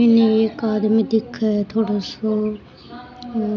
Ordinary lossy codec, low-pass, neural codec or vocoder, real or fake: none; 7.2 kHz; none; real